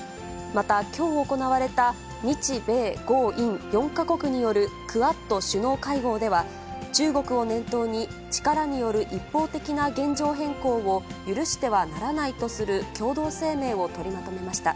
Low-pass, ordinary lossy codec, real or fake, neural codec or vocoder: none; none; real; none